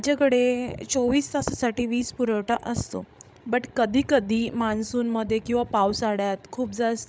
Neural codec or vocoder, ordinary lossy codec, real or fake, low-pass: codec, 16 kHz, 16 kbps, FreqCodec, larger model; none; fake; none